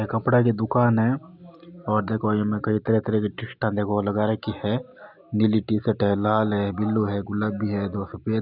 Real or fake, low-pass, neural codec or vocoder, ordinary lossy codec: real; 5.4 kHz; none; none